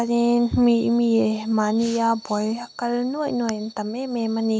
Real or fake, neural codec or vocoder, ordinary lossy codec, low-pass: real; none; none; none